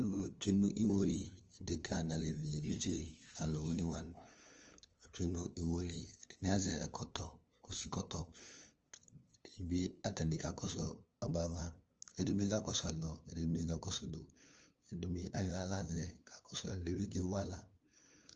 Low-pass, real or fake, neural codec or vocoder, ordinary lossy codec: 7.2 kHz; fake; codec, 16 kHz, 2 kbps, FunCodec, trained on LibriTTS, 25 frames a second; Opus, 24 kbps